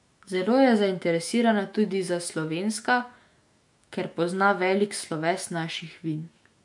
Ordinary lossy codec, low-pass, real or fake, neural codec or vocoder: MP3, 64 kbps; 10.8 kHz; fake; autoencoder, 48 kHz, 128 numbers a frame, DAC-VAE, trained on Japanese speech